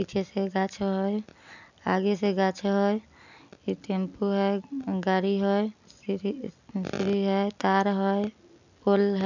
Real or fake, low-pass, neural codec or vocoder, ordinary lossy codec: real; 7.2 kHz; none; none